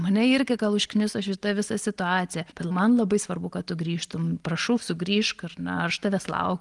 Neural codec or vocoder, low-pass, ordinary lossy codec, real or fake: none; 10.8 kHz; Opus, 24 kbps; real